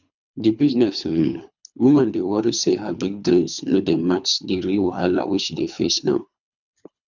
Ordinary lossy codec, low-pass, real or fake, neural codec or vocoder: none; 7.2 kHz; fake; codec, 24 kHz, 3 kbps, HILCodec